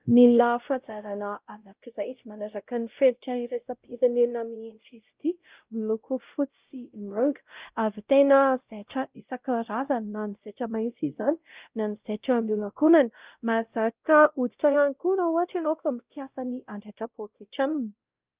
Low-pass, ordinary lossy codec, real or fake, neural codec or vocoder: 3.6 kHz; Opus, 24 kbps; fake; codec, 16 kHz, 0.5 kbps, X-Codec, WavLM features, trained on Multilingual LibriSpeech